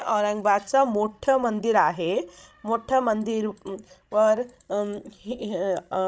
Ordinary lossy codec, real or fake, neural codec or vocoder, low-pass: none; fake; codec, 16 kHz, 16 kbps, FreqCodec, larger model; none